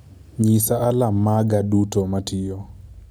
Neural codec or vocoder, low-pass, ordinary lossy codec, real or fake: none; none; none; real